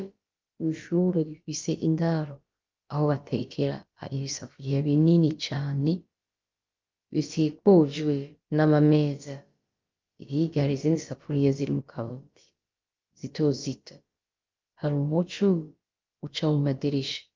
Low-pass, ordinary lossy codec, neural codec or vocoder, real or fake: 7.2 kHz; Opus, 24 kbps; codec, 16 kHz, about 1 kbps, DyCAST, with the encoder's durations; fake